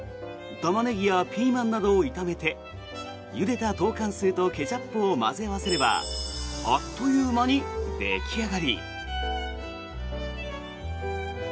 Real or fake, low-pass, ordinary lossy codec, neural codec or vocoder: real; none; none; none